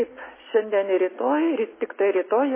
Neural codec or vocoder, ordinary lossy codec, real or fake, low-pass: none; MP3, 16 kbps; real; 3.6 kHz